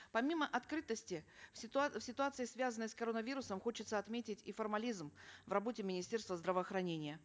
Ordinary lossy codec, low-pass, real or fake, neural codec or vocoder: none; none; real; none